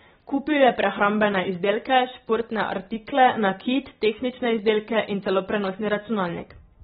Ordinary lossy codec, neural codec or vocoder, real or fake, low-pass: AAC, 16 kbps; none; real; 19.8 kHz